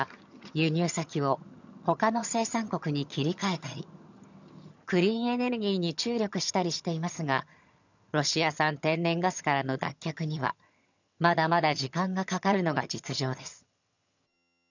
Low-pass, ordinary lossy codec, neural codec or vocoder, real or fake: 7.2 kHz; none; vocoder, 22.05 kHz, 80 mel bands, HiFi-GAN; fake